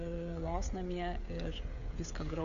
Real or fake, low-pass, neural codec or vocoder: fake; 7.2 kHz; codec, 16 kHz, 16 kbps, FreqCodec, smaller model